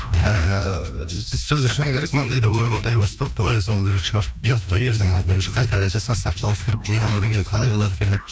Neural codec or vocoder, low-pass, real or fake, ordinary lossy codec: codec, 16 kHz, 1 kbps, FreqCodec, larger model; none; fake; none